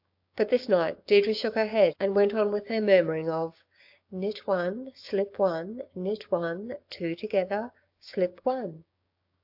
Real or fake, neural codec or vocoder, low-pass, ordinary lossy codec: fake; codec, 16 kHz, 6 kbps, DAC; 5.4 kHz; AAC, 48 kbps